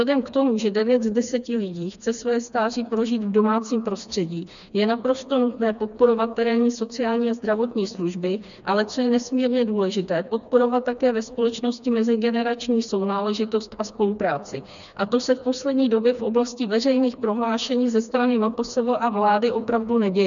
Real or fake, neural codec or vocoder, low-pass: fake; codec, 16 kHz, 2 kbps, FreqCodec, smaller model; 7.2 kHz